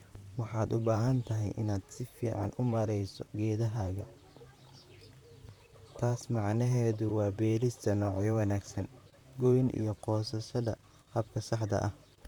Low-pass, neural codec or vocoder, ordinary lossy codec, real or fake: 19.8 kHz; vocoder, 44.1 kHz, 128 mel bands, Pupu-Vocoder; none; fake